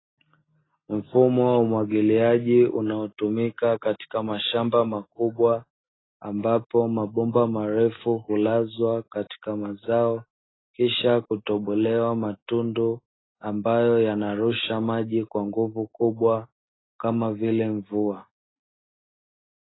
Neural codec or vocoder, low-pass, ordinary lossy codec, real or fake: none; 7.2 kHz; AAC, 16 kbps; real